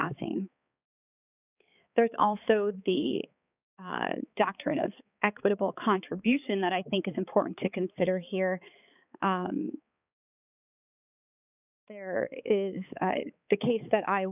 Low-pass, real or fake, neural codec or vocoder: 3.6 kHz; fake; codec, 16 kHz, 4 kbps, X-Codec, HuBERT features, trained on balanced general audio